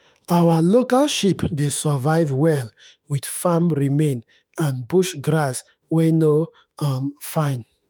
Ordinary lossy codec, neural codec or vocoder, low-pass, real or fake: none; autoencoder, 48 kHz, 32 numbers a frame, DAC-VAE, trained on Japanese speech; none; fake